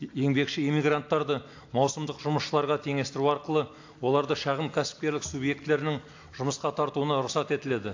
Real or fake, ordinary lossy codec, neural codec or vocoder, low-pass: real; AAC, 48 kbps; none; 7.2 kHz